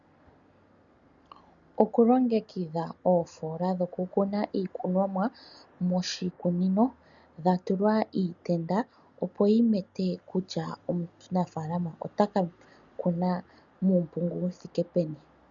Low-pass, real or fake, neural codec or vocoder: 7.2 kHz; real; none